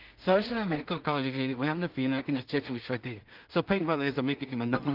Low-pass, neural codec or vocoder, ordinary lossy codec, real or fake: 5.4 kHz; codec, 16 kHz in and 24 kHz out, 0.4 kbps, LongCat-Audio-Codec, two codebook decoder; Opus, 24 kbps; fake